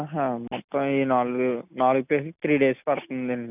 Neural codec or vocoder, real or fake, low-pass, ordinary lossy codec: none; real; 3.6 kHz; none